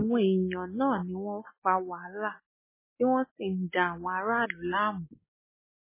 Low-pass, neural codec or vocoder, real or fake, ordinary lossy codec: 3.6 kHz; none; real; MP3, 16 kbps